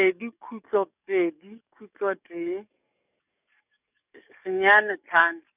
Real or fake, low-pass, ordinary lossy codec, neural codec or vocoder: fake; 3.6 kHz; none; codec, 44.1 kHz, 7.8 kbps, DAC